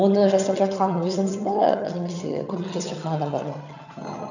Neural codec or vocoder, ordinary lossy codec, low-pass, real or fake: vocoder, 22.05 kHz, 80 mel bands, HiFi-GAN; none; 7.2 kHz; fake